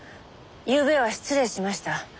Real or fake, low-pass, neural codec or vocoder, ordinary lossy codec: real; none; none; none